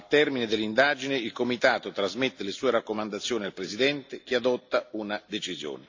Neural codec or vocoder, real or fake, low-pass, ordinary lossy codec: none; real; 7.2 kHz; AAC, 48 kbps